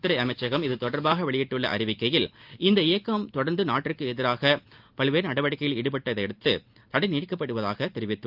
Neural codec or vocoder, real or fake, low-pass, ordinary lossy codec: none; real; 5.4 kHz; Opus, 24 kbps